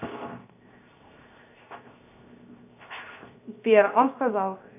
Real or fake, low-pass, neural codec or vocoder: fake; 3.6 kHz; codec, 16 kHz, 0.7 kbps, FocalCodec